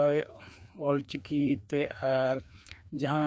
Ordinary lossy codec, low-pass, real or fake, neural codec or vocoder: none; none; fake; codec, 16 kHz, 2 kbps, FreqCodec, larger model